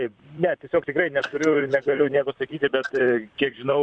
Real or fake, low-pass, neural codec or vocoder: fake; 9.9 kHz; vocoder, 44.1 kHz, 128 mel bands, Pupu-Vocoder